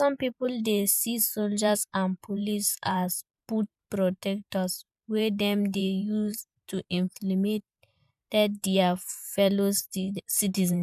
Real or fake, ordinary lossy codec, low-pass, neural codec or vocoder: fake; none; 14.4 kHz; vocoder, 44.1 kHz, 128 mel bands every 512 samples, BigVGAN v2